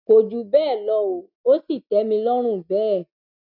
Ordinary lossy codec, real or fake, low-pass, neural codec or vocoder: none; real; 5.4 kHz; none